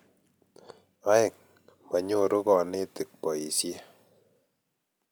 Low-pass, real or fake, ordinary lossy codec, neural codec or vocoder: none; real; none; none